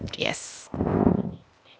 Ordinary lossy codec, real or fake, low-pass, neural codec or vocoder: none; fake; none; codec, 16 kHz, 0.8 kbps, ZipCodec